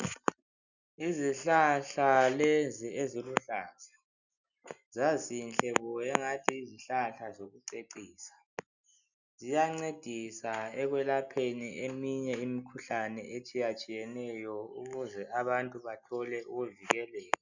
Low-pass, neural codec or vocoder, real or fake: 7.2 kHz; none; real